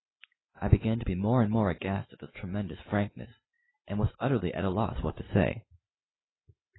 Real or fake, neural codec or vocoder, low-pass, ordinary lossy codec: real; none; 7.2 kHz; AAC, 16 kbps